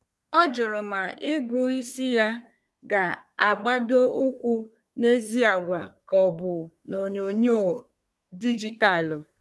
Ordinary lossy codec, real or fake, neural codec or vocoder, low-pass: none; fake; codec, 24 kHz, 1 kbps, SNAC; none